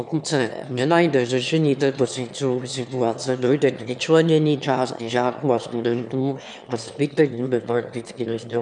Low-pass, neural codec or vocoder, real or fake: 9.9 kHz; autoencoder, 22.05 kHz, a latent of 192 numbers a frame, VITS, trained on one speaker; fake